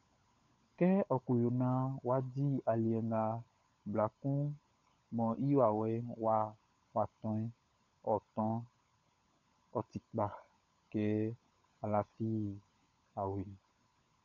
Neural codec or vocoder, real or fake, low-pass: codec, 16 kHz, 16 kbps, FunCodec, trained on LibriTTS, 50 frames a second; fake; 7.2 kHz